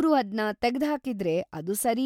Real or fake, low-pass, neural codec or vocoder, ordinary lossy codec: real; 19.8 kHz; none; MP3, 96 kbps